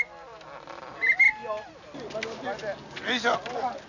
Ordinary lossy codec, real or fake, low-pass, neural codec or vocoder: none; real; 7.2 kHz; none